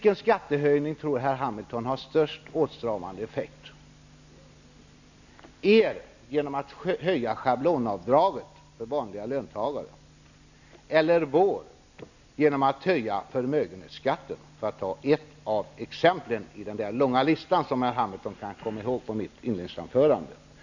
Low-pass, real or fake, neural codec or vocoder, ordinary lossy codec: 7.2 kHz; real; none; none